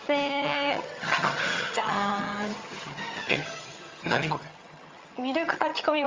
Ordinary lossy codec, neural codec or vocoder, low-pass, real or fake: Opus, 32 kbps; vocoder, 22.05 kHz, 80 mel bands, HiFi-GAN; 7.2 kHz; fake